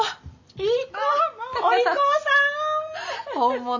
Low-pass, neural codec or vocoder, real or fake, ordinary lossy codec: 7.2 kHz; none; real; AAC, 48 kbps